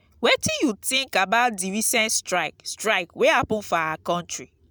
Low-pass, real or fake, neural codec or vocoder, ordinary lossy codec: none; real; none; none